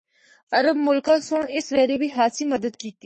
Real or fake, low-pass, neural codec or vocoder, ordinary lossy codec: fake; 10.8 kHz; codec, 44.1 kHz, 3.4 kbps, Pupu-Codec; MP3, 32 kbps